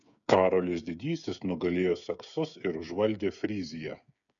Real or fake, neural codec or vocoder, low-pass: fake; codec, 16 kHz, 8 kbps, FreqCodec, smaller model; 7.2 kHz